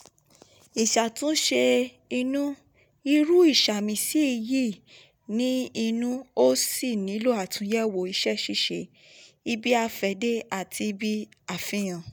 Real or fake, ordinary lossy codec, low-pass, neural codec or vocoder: real; none; none; none